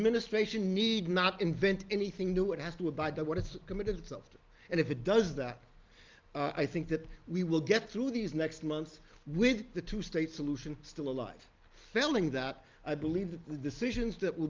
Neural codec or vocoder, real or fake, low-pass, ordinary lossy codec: none; real; 7.2 kHz; Opus, 32 kbps